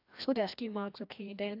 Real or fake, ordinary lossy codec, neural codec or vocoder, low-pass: fake; AAC, 32 kbps; codec, 16 kHz, 1 kbps, FreqCodec, larger model; 5.4 kHz